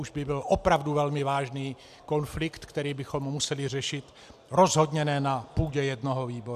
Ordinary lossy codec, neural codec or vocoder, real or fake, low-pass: AAC, 96 kbps; none; real; 14.4 kHz